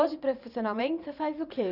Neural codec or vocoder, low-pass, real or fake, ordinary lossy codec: vocoder, 44.1 kHz, 80 mel bands, Vocos; 5.4 kHz; fake; none